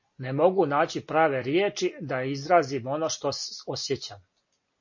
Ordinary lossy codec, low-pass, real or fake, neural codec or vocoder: MP3, 32 kbps; 7.2 kHz; real; none